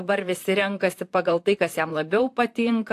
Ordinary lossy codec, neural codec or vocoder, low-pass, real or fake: AAC, 64 kbps; vocoder, 44.1 kHz, 128 mel bands, Pupu-Vocoder; 14.4 kHz; fake